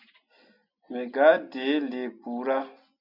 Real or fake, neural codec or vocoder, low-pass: real; none; 5.4 kHz